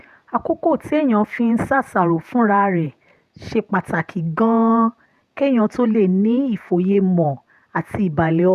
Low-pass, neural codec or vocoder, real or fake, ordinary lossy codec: 14.4 kHz; vocoder, 48 kHz, 128 mel bands, Vocos; fake; none